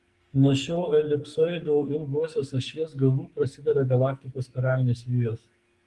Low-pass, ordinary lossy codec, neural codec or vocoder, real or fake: 10.8 kHz; Opus, 24 kbps; codec, 44.1 kHz, 2.6 kbps, SNAC; fake